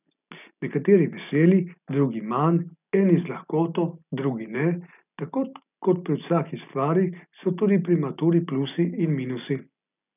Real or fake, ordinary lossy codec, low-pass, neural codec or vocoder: real; none; 3.6 kHz; none